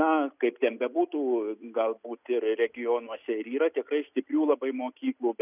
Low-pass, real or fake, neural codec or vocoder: 3.6 kHz; real; none